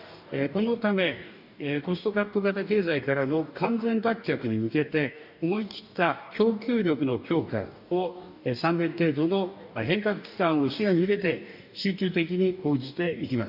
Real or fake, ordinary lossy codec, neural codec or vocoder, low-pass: fake; none; codec, 44.1 kHz, 2.6 kbps, DAC; 5.4 kHz